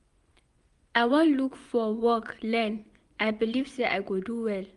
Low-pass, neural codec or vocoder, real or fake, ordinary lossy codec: 10.8 kHz; vocoder, 24 kHz, 100 mel bands, Vocos; fake; Opus, 32 kbps